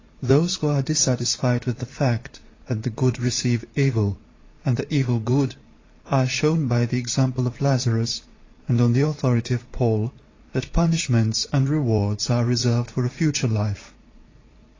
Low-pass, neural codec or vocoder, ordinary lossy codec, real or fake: 7.2 kHz; vocoder, 44.1 kHz, 128 mel bands every 512 samples, BigVGAN v2; AAC, 32 kbps; fake